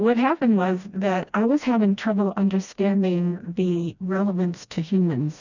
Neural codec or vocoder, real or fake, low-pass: codec, 16 kHz, 1 kbps, FreqCodec, smaller model; fake; 7.2 kHz